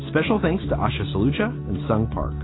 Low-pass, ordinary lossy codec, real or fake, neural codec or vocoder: 7.2 kHz; AAC, 16 kbps; real; none